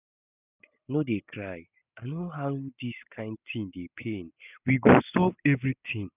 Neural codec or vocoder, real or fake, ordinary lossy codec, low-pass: none; real; none; 3.6 kHz